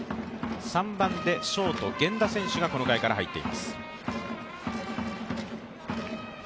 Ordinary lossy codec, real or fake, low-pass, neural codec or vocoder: none; real; none; none